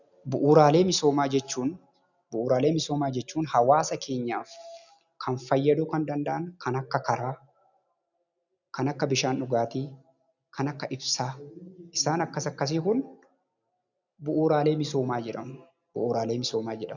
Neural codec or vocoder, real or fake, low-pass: none; real; 7.2 kHz